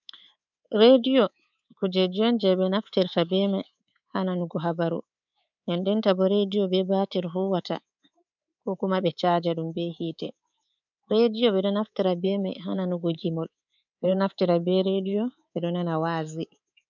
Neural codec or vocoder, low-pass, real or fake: codec, 24 kHz, 3.1 kbps, DualCodec; 7.2 kHz; fake